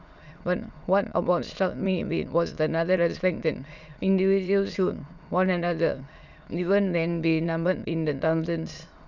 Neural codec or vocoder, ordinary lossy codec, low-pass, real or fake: autoencoder, 22.05 kHz, a latent of 192 numbers a frame, VITS, trained on many speakers; none; 7.2 kHz; fake